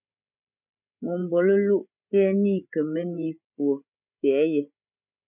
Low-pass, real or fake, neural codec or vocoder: 3.6 kHz; fake; codec, 16 kHz, 16 kbps, FreqCodec, larger model